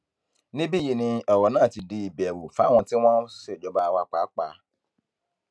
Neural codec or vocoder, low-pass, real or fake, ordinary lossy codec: none; none; real; none